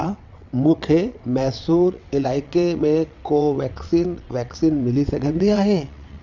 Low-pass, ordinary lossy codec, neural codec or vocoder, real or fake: 7.2 kHz; none; vocoder, 22.05 kHz, 80 mel bands, WaveNeXt; fake